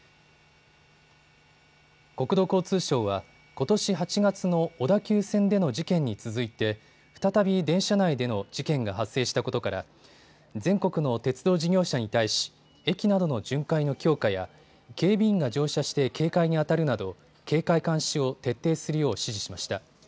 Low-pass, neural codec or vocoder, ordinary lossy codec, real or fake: none; none; none; real